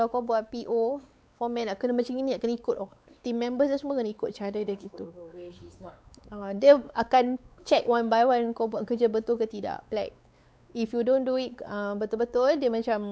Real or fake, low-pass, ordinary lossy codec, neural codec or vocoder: fake; none; none; codec, 16 kHz, 4 kbps, X-Codec, WavLM features, trained on Multilingual LibriSpeech